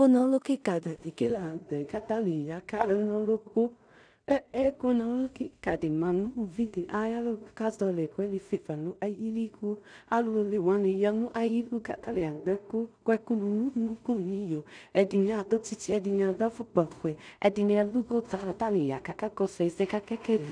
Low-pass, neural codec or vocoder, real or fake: 9.9 kHz; codec, 16 kHz in and 24 kHz out, 0.4 kbps, LongCat-Audio-Codec, two codebook decoder; fake